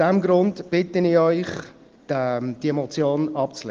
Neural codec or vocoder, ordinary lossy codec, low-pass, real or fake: none; Opus, 16 kbps; 7.2 kHz; real